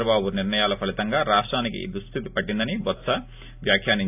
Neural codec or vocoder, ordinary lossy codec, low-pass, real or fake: none; AAC, 32 kbps; 3.6 kHz; real